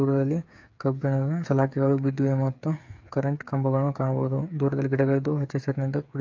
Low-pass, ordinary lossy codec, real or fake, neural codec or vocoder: 7.2 kHz; AAC, 48 kbps; fake; codec, 16 kHz, 8 kbps, FreqCodec, smaller model